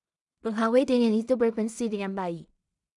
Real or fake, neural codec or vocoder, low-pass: fake; codec, 16 kHz in and 24 kHz out, 0.4 kbps, LongCat-Audio-Codec, two codebook decoder; 10.8 kHz